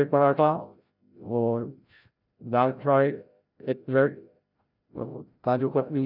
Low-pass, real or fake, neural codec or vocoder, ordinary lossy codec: 5.4 kHz; fake; codec, 16 kHz, 0.5 kbps, FreqCodec, larger model; none